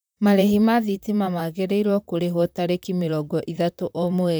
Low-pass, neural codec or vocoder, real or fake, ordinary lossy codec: none; vocoder, 44.1 kHz, 128 mel bands, Pupu-Vocoder; fake; none